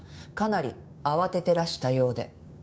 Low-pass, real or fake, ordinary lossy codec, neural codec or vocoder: none; fake; none; codec, 16 kHz, 6 kbps, DAC